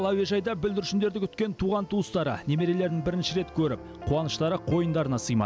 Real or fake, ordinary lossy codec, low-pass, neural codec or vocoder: real; none; none; none